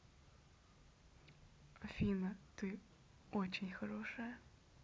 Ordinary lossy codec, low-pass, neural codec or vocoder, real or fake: none; none; none; real